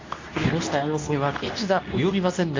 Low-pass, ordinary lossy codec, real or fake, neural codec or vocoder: 7.2 kHz; AAC, 48 kbps; fake; codec, 24 kHz, 0.9 kbps, WavTokenizer, medium speech release version 2